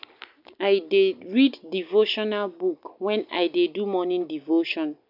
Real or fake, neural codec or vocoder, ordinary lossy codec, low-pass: real; none; none; 5.4 kHz